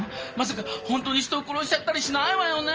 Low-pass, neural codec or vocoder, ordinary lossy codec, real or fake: 7.2 kHz; none; Opus, 24 kbps; real